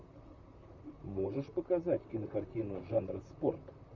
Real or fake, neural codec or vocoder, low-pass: fake; vocoder, 44.1 kHz, 128 mel bands, Pupu-Vocoder; 7.2 kHz